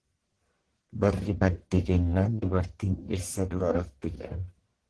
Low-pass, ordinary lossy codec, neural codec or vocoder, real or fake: 10.8 kHz; Opus, 16 kbps; codec, 44.1 kHz, 1.7 kbps, Pupu-Codec; fake